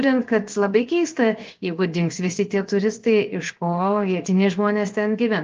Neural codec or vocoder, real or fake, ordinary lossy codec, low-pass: codec, 16 kHz, 0.7 kbps, FocalCodec; fake; Opus, 24 kbps; 7.2 kHz